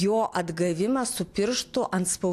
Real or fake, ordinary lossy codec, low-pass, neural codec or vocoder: real; AAC, 64 kbps; 14.4 kHz; none